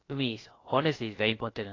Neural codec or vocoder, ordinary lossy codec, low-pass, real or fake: codec, 16 kHz, about 1 kbps, DyCAST, with the encoder's durations; AAC, 32 kbps; 7.2 kHz; fake